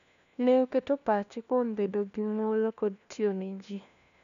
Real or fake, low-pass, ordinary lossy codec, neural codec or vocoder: fake; 7.2 kHz; none; codec, 16 kHz, 1 kbps, FunCodec, trained on LibriTTS, 50 frames a second